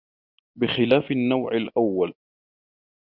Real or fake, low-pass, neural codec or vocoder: real; 5.4 kHz; none